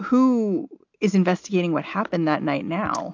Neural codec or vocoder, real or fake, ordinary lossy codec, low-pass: none; real; AAC, 48 kbps; 7.2 kHz